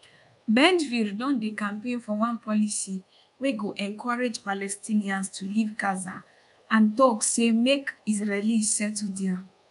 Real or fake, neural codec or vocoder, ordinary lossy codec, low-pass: fake; codec, 24 kHz, 1.2 kbps, DualCodec; none; 10.8 kHz